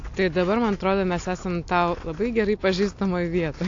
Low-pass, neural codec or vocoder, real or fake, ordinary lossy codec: 7.2 kHz; none; real; AAC, 48 kbps